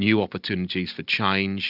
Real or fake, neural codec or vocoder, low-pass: real; none; 5.4 kHz